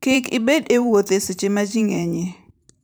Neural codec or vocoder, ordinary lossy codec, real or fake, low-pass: vocoder, 44.1 kHz, 128 mel bands every 512 samples, BigVGAN v2; none; fake; none